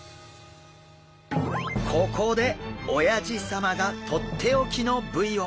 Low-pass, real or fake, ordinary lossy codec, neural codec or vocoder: none; real; none; none